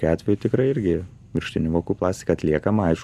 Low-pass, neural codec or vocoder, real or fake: 14.4 kHz; none; real